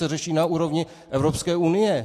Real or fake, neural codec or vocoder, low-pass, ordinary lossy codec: real; none; 14.4 kHz; AAC, 64 kbps